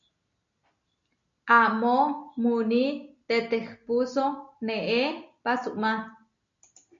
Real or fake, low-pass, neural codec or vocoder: real; 7.2 kHz; none